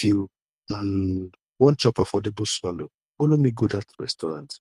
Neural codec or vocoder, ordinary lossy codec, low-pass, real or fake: codec, 24 kHz, 3 kbps, HILCodec; none; none; fake